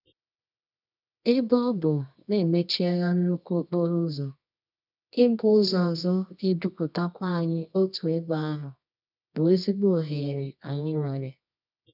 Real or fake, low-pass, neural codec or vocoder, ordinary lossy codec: fake; 5.4 kHz; codec, 24 kHz, 0.9 kbps, WavTokenizer, medium music audio release; none